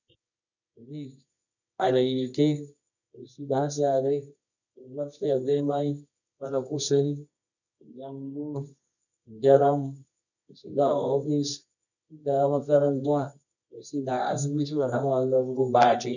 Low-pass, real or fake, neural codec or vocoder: 7.2 kHz; fake; codec, 24 kHz, 0.9 kbps, WavTokenizer, medium music audio release